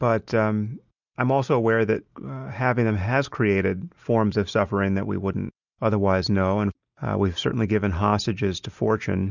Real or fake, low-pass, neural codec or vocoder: real; 7.2 kHz; none